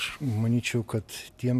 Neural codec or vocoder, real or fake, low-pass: vocoder, 44.1 kHz, 128 mel bands every 256 samples, BigVGAN v2; fake; 14.4 kHz